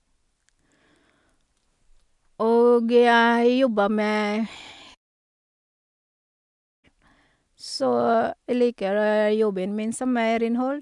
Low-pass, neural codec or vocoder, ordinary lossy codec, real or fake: 10.8 kHz; none; MP3, 96 kbps; real